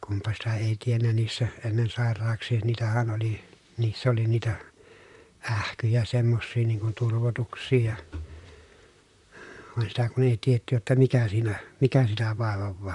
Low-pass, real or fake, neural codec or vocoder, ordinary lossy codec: 10.8 kHz; fake; vocoder, 44.1 kHz, 128 mel bands, Pupu-Vocoder; none